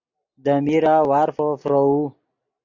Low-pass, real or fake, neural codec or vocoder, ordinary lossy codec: 7.2 kHz; real; none; AAC, 48 kbps